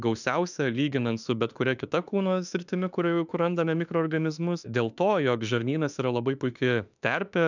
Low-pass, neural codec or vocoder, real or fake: 7.2 kHz; autoencoder, 48 kHz, 32 numbers a frame, DAC-VAE, trained on Japanese speech; fake